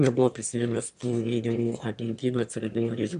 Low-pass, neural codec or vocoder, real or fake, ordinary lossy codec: 9.9 kHz; autoencoder, 22.05 kHz, a latent of 192 numbers a frame, VITS, trained on one speaker; fake; AAC, 96 kbps